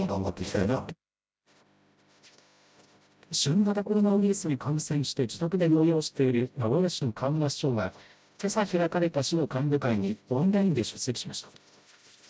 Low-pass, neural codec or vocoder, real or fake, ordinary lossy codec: none; codec, 16 kHz, 0.5 kbps, FreqCodec, smaller model; fake; none